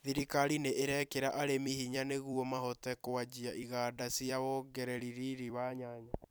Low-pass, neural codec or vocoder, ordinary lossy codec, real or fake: none; vocoder, 44.1 kHz, 128 mel bands every 256 samples, BigVGAN v2; none; fake